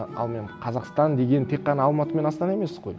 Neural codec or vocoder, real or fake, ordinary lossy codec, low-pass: none; real; none; none